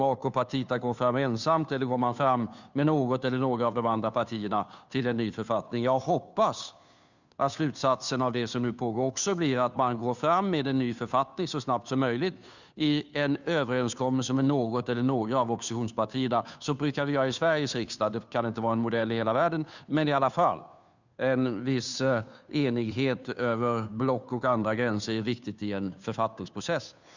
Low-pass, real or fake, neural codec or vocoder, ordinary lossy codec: 7.2 kHz; fake; codec, 16 kHz, 2 kbps, FunCodec, trained on Chinese and English, 25 frames a second; none